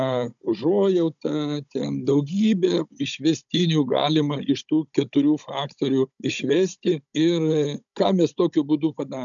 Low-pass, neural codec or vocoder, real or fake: 7.2 kHz; codec, 16 kHz, 16 kbps, FunCodec, trained on Chinese and English, 50 frames a second; fake